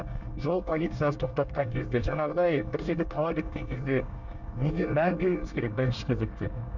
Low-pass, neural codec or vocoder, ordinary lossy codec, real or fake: 7.2 kHz; codec, 24 kHz, 1 kbps, SNAC; none; fake